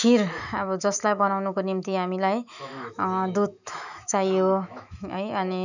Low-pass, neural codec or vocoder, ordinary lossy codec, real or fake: 7.2 kHz; autoencoder, 48 kHz, 128 numbers a frame, DAC-VAE, trained on Japanese speech; none; fake